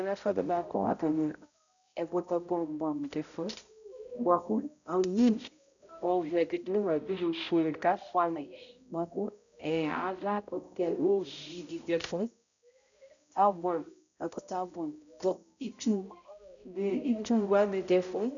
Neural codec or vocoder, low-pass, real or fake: codec, 16 kHz, 0.5 kbps, X-Codec, HuBERT features, trained on balanced general audio; 7.2 kHz; fake